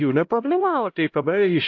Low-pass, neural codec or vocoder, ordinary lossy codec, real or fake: 7.2 kHz; codec, 16 kHz, 0.5 kbps, X-Codec, HuBERT features, trained on LibriSpeech; AAC, 48 kbps; fake